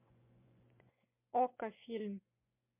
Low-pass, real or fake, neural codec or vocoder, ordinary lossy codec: 3.6 kHz; fake; vocoder, 24 kHz, 100 mel bands, Vocos; MP3, 32 kbps